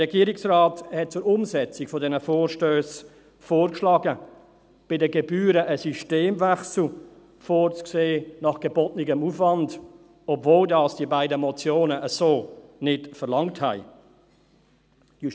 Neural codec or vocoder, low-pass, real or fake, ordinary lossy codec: none; none; real; none